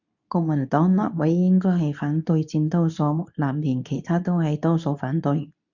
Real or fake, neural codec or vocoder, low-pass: fake; codec, 24 kHz, 0.9 kbps, WavTokenizer, medium speech release version 2; 7.2 kHz